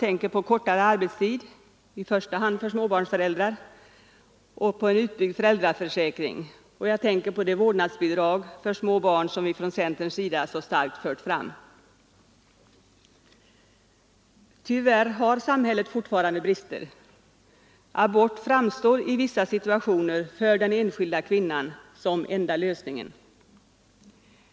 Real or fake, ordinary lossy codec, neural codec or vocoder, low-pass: real; none; none; none